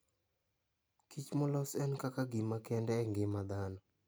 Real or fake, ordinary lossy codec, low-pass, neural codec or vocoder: real; none; none; none